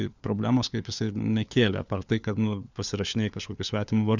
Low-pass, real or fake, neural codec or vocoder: 7.2 kHz; fake; codec, 16 kHz, 4 kbps, FunCodec, trained on LibriTTS, 50 frames a second